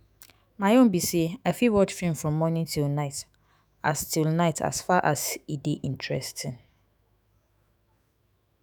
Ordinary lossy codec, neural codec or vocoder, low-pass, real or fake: none; autoencoder, 48 kHz, 128 numbers a frame, DAC-VAE, trained on Japanese speech; none; fake